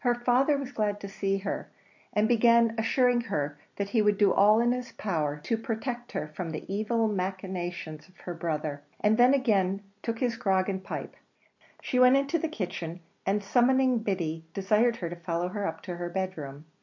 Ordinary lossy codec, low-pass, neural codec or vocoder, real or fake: MP3, 48 kbps; 7.2 kHz; none; real